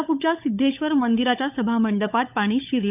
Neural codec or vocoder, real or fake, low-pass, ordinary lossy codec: codec, 16 kHz, 16 kbps, FunCodec, trained on LibriTTS, 50 frames a second; fake; 3.6 kHz; none